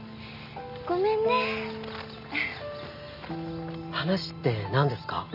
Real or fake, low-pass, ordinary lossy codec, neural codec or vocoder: real; 5.4 kHz; none; none